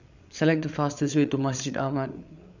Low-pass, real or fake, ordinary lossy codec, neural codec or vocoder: 7.2 kHz; fake; none; codec, 16 kHz, 8 kbps, FreqCodec, larger model